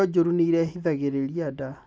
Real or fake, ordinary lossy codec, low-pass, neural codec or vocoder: real; none; none; none